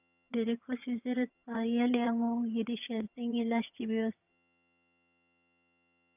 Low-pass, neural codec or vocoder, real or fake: 3.6 kHz; vocoder, 22.05 kHz, 80 mel bands, HiFi-GAN; fake